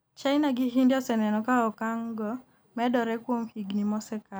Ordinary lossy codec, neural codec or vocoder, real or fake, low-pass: none; none; real; none